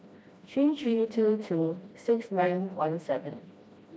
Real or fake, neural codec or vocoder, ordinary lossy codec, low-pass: fake; codec, 16 kHz, 1 kbps, FreqCodec, smaller model; none; none